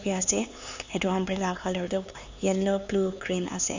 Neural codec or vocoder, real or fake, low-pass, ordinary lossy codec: codec, 16 kHz, 4 kbps, X-Codec, HuBERT features, trained on LibriSpeech; fake; 7.2 kHz; Opus, 64 kbps